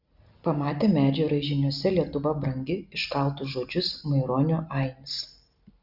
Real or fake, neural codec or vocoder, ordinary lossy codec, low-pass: real; none; Opus, 64 kbps; 5.4 kHz